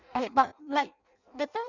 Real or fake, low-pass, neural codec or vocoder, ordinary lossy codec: fake; 7.2 kHz; codec, 16 kHz in and 24 kHz out, 0.6 kbps, FireRedTTS-2 codec; none